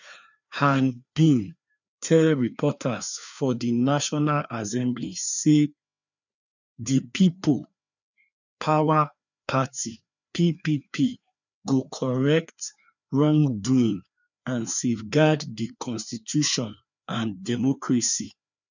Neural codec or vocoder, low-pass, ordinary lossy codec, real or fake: codec, 16 kHz, 2 kbps, FreqCodec, larger model; 7.2 kHz; none; fake